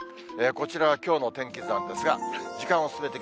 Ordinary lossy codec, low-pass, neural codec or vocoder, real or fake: none; none; none; real